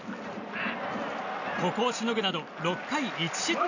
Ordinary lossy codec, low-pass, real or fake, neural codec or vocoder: none; 7.2 kHz; real; none